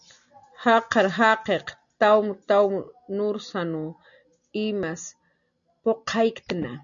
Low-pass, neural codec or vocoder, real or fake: 7.2 kHz; none; real